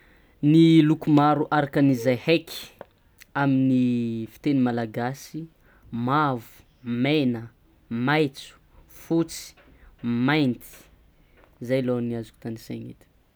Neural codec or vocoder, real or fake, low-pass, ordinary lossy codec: none; real; none; none